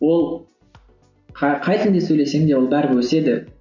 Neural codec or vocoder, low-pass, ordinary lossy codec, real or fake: none; 7.2 kHz; none; real